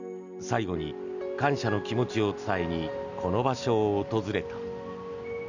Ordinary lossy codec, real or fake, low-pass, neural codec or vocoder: none; real; 7.2 kHz; none